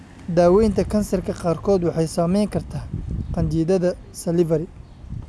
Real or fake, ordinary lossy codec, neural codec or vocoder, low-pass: real; none; none; none